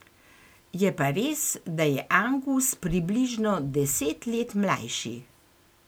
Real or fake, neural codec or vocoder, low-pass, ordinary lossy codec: real; none; none; none